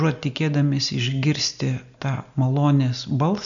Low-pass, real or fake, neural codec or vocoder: 7.2 kHz; real; none